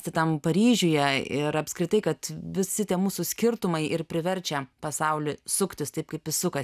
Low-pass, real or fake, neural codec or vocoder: 14.4 kHz; real; none